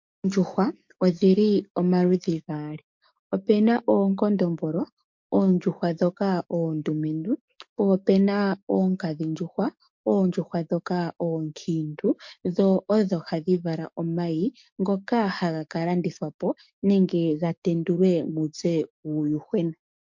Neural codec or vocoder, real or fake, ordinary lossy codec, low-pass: codec, 44.1 kHz, 7.8 kbps, DAC; fake; MP3, 48 kbps; 7.2 kHz